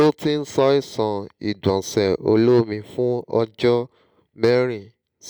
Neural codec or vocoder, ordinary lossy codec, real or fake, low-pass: none; none; real; none